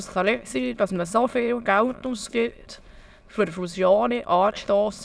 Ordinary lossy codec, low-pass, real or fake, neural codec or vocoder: none; none; fake; autoencoder, 22.05 kHz, a latent of 192 numbers a frame, VITS, trained on many speakers